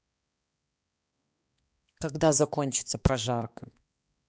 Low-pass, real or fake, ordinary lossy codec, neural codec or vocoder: none; fake; none; codec, 16 kHz, 2 kbps, X-Codec, HuBERT features, trained on balanced general audio